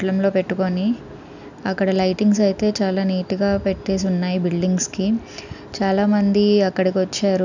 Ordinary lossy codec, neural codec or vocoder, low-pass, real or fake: none; none; 7.2 kHz; real